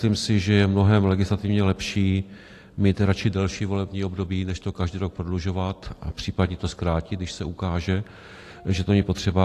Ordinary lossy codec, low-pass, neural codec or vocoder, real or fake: AAC, 48 kbps; 14.4 kHz; none; real